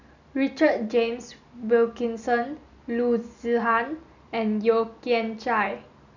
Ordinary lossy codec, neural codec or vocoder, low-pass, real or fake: none; none; 7.2 kHz; real